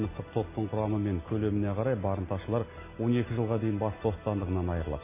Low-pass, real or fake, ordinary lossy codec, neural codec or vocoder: 3.6 kHz; real; MP3, 16 kbps; none